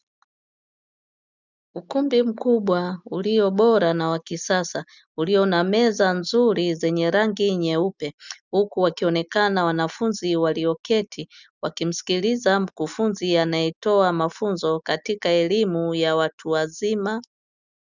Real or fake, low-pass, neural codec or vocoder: real; 7.2 kHz; none